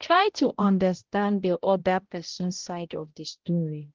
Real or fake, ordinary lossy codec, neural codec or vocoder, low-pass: fake; Opus, 16 kbps; codec, 16 kHz, 0.5 kbps, X-Codec, HuBERT features, trained on balanced general audio; 7.2 kHz